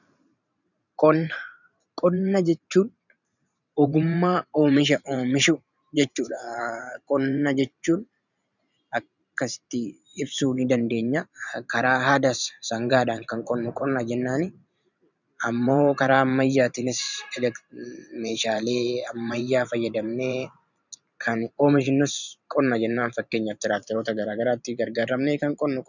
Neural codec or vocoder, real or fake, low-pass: vocoder, 44.1 kHz, 128 mel bands every 512 samples, BigVGAN v2; fake; 7.2 kHz